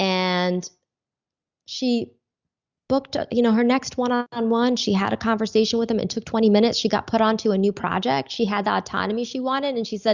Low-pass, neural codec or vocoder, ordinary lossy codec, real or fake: 7.2 kHz; none; Opus, 64 kbps; real